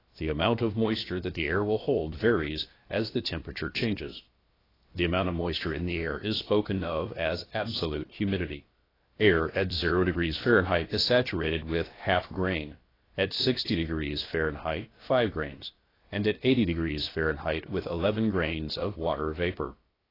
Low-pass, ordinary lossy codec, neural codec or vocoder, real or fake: 5.4 kHz; AAC, 24 kbps; codec, 16 kHz, about 1 kbps, DyCAST, with the encoder's durations; fake